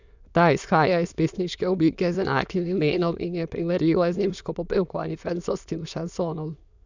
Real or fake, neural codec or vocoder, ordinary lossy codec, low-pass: fake; autoencoder, 22.05 kHz, a latent of 192 numbers a frame, VITS, trained on many speakers; none; 7.2 kHz